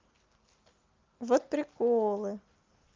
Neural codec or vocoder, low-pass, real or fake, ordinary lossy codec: none; 7.2 kHz; real; Opus, 32 kbps